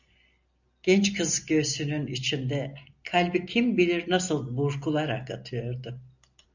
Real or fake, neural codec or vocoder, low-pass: real; none; 7.2 kHz